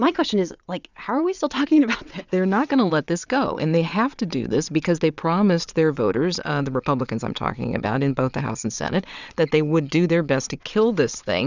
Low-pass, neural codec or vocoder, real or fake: 7.2 kHz; vocoder, 22.05 kHz, 80 mel bands, WaveNeXt; fake